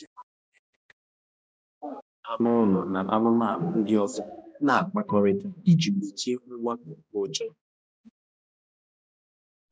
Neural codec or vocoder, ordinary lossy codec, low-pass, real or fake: codec, 16 kHz, 1 kbps, X-Codec, HuBERT features, trained on balanced general audio; none; none; fake